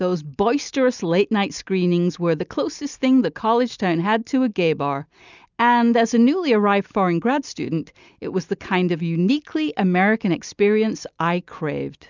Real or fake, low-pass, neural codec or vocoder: real; 7.2 kHz; none